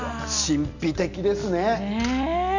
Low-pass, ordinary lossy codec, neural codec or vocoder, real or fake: 7.2 kHz; none; none; real